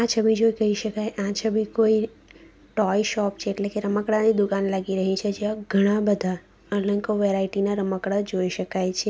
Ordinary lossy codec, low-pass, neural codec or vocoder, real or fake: none; none; none; real